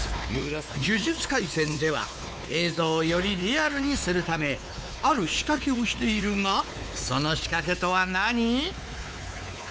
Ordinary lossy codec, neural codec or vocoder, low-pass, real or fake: none; codec, 16 kHz, 4 kbps, X-Codec, WavLM features, trained on Multilingual LibriSpeech; none; fake